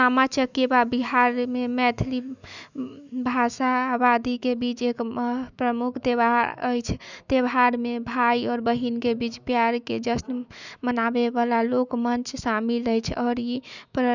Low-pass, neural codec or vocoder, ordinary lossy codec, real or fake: 7.2 kHz; autoencoder, 48 kHz, 128 numbers a frame, DAC-VAE, trained on Japanese speech; none; fake